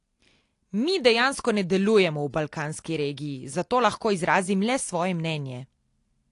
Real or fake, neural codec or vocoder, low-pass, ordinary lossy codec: real; none; 10.8 kHz; AAC, 48 kbps